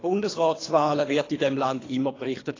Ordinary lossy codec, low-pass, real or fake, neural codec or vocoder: AAC, 32 kbps; 7.2 kHz; fake; codec, 24 kHz, 3 kbps, HILCodec